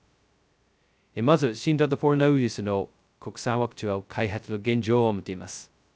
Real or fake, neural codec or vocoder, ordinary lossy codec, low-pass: fake; codec, 16 kHz, 0.2 kbps, FocalCodec; none; none